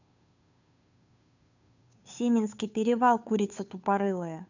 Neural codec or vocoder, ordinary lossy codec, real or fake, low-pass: codec, 16 kHz, 2 kbps, FunCodec, trained on Chinese and English, 25 frames a second; none; fake; 7.2 kHz